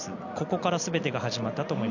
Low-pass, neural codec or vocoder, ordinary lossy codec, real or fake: 7.2 kHz; none; none; real